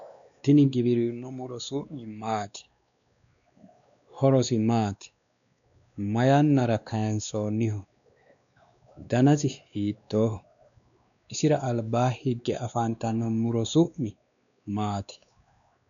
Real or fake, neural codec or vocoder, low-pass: fake; codec, 16 kHz, 2 kbps, X-Codec, WavLM features, trained on Multilingual LibriSpeech; 7.2 kHz